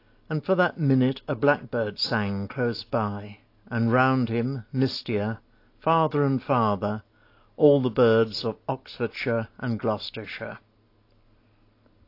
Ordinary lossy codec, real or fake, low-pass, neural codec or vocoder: AAC, 32 kbps; real; 5.4 kHz; none